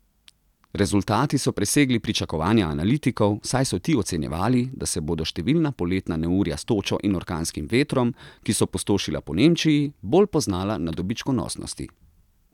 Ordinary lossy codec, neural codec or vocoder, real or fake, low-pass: none; none; real; 19.8 kHz